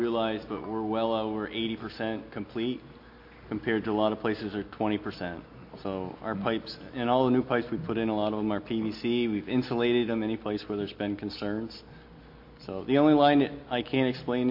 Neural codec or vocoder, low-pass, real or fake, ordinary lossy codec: none; 5.4 kHz; real; MP3, 32 kbps